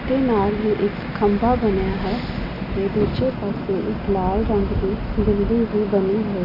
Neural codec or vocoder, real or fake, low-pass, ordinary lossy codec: none; real; 5.4 kHz; none